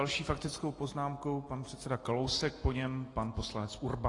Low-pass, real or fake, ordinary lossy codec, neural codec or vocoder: 10.8 kHz; real; AAC, 32 kbps; none